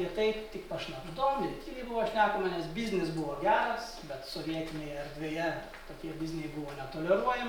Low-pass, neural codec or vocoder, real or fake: 19.8 kHz; none; real